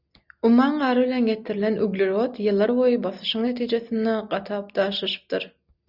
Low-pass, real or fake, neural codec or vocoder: 5.4 kHz; real; none